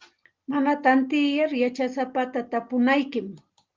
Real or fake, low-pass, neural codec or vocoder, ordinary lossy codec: real; 7.2 kHz; none; Opus, 32 kbps